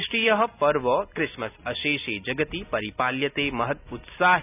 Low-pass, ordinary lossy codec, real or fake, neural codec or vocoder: 3.6 kHz; none; real; none